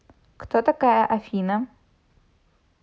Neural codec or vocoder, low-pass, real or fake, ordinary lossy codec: none; none; real; none